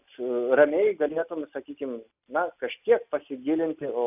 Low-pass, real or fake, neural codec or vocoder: 3.6 kHz; real; none